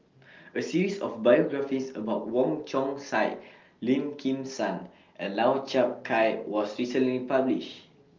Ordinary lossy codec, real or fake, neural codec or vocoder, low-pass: Opus, 24 kbps; real; none; 7.2 kHz